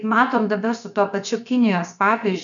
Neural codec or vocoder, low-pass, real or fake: codec, 16 kHz, 0.7 kbps, FocalCodec; 7.2 kHz; fake